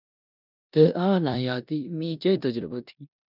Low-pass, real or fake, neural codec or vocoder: 5.4 kHz; fake; codec, 16 kHz in and 24 kHz out, 0.9 kbps, LongCat-Audio-Codec, four codebook decoder